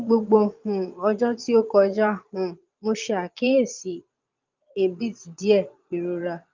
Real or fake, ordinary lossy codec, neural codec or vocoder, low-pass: fake; Opus, 24 kbps; vocoder, 22.05 kHz, 80 mel bands, Vocos; 7.2 kHz